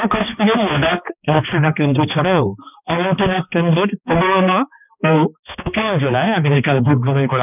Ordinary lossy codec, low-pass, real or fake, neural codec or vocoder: none; 3.6 kHz; fake; codec, 16 kHz, 2 kbps, X-Codec, HuBERT features, trained on balanced general audio